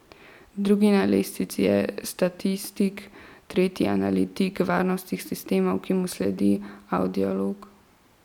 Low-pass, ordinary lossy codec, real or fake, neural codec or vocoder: 19.8 kHz; none; real; none